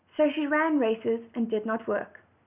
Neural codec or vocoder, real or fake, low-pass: none; real; 3.6 kHz